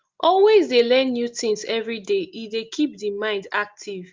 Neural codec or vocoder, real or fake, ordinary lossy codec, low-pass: none; real; Opus, 32 kbps; 7.2 kHz